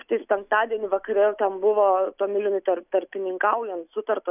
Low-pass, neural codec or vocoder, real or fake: 3.6 kHz; none; real